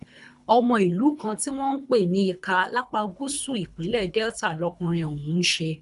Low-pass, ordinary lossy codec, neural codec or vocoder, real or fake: 10.8 kHz; none; codec, 24 kHz, 3 kbps, HILCodec; fake